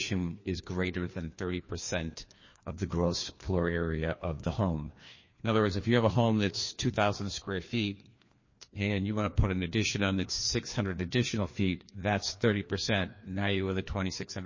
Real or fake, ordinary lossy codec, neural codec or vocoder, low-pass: fake; MP3, 32 kbps; codec, 16 kHz, 2 kbps, FreqCodec, larger model; 7.2 kHz